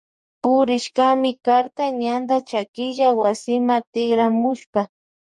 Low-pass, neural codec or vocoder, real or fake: 10.8 kHz; codec, 44.1 kHz, 2.6 kbps, DAC; fake